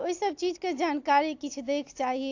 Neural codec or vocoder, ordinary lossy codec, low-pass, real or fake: none; AAC, 48 kbps; 7.2 kHz; real